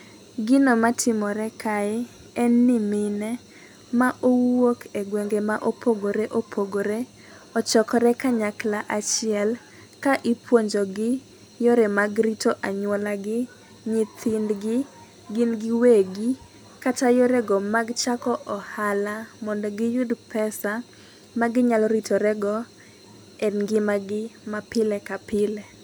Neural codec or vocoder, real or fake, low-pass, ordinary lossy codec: none; real; none; none